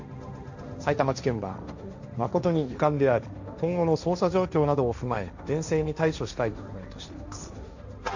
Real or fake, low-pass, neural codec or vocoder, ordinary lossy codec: fake; 7.2 kHz; codec, 16 kHz, 1.1 kbps, Voila-Tokenizer; none